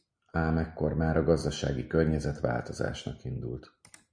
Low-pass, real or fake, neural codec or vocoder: 9.9 kHz; real; none